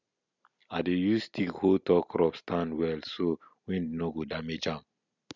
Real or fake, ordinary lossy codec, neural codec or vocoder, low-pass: real; none; none; 7.2 kHz